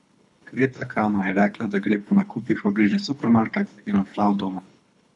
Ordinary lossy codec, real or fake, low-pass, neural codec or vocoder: none; fake; 10.8 kHz; codec, 24 kHz, 3 kbps, HILCodec